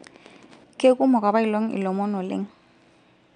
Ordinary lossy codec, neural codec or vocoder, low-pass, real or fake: none; none; 9.9 kHz; real